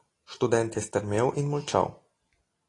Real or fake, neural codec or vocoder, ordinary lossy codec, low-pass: real; none; AAC, 32 kbps; 10.8 kHz